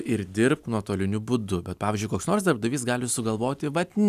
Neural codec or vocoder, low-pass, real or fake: none; 14.4 kHz; real